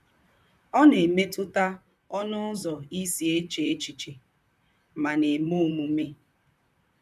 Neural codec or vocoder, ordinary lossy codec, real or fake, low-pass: vocoder, 44.1 kHz, 128 mel bands, Pupu-Vocoder; none; fake; 14.4 kHz